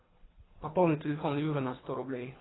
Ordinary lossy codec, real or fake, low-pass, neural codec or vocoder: AAC, 16 kbps; fake; 7.2 kHz; codec, 24 kHz, 3 kbps, HILCodec